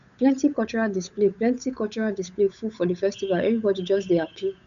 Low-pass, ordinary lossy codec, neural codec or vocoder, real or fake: 7.2 kHz; none; codec, 16 kHz, 8 kbps, FunCodec, trained on Chinese and English, 25 frames a second; fake